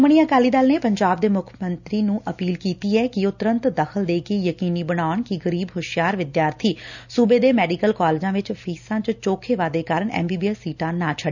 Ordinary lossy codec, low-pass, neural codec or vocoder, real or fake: none; 7.2 kHz; none; real